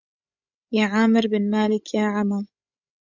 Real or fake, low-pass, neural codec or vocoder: fake; 7.2 kHz; codec, 16 kHz, 16 kbps, FreqCodec, larger model